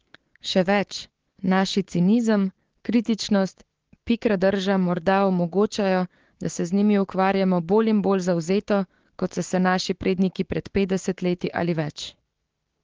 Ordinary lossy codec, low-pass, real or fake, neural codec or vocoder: Opus, 16 kbps; 7.2 kHz; fake; codec, 16 kHz, 6 kbps, DAC